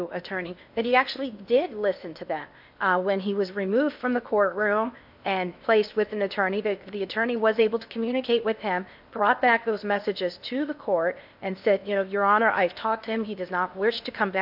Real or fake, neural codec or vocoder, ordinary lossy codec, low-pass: fake; codec, 16 kHz in and 24 kHz out, 0.8 kbps, FocalCodec, streaming, 65536 codes; AAC, 48 kbps; 5.4 kHz